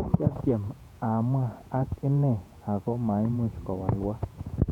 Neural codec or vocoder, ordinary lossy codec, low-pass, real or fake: vocoder, 48 kHz, 128 mel bands, Vocos; none; 19.8 kHz; fake